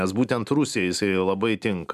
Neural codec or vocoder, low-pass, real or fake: none; 14.4 kHz; real